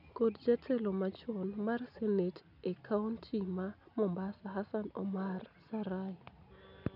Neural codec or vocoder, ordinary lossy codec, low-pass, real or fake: none; none; 5.4 kHz; real